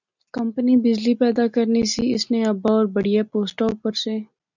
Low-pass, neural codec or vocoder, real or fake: 7.2 kHz; none; real